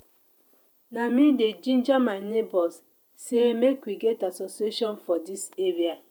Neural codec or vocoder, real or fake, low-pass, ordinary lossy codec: vocoder, 48 kHz, 128 mel bands, Vocos; fake; none; none